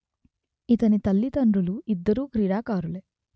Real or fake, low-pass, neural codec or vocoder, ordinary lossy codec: real; none; none; none